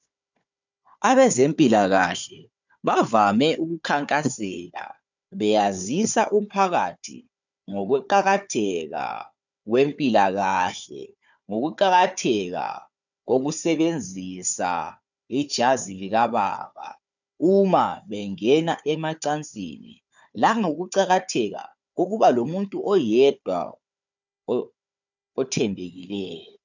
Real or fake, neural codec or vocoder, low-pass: fake; codec, 16 kHz, 4 kbps, FunCodec, trained on Chinese and English, 50 frames a second; 7.2 kHz